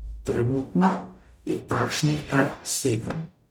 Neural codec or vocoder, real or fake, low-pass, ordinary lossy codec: codec, 44.1 kHz, 0.9 kbps, DAC; fake; 19.8 kHz; none